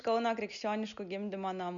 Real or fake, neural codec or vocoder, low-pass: real; none; 7.2 kHz